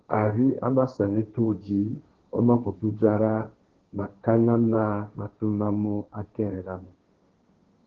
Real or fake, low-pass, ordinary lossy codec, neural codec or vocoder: fake; 7.2 kHz; Opus, 16 kbps; codec, 16 kHz, 1.1 kbps, Voila-Tokenizer